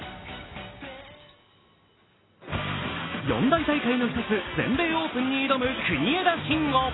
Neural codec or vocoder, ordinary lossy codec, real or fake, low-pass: none; AAC, 16 kbps; real; 7.2 kHz